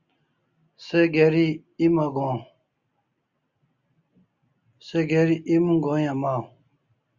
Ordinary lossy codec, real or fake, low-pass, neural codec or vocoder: Opus, 64 kbps; real; 7.2 kHz; none